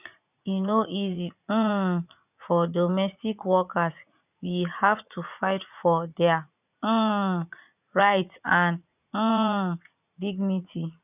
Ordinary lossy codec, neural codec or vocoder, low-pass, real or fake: none; vocoder, 44.1 kHz, 80 mel bands, Vocos; 3.6 kHz; fake